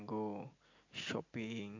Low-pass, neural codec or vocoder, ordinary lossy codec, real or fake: 7.2 kHz; none; AAC, 48 kbps; real